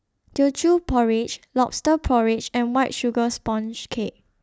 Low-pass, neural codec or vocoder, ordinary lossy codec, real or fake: none; none; none; real